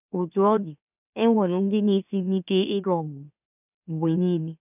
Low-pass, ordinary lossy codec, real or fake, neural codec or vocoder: 3.6 kHz; none; fake; autoencoder, 44.1 kHz, a latent of 192 numbers a frame, MeloTTS